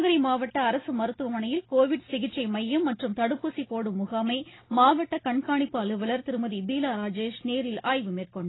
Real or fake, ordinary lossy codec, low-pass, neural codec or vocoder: real; AAC, 16 kbps; 7.2 kHz; none